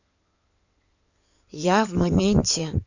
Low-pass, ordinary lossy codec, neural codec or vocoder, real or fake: 7.2 kHz; none; codec, 16 kHz in and 24 kHz out, 2.2 kbps, FireRedTTS-2 codec; fake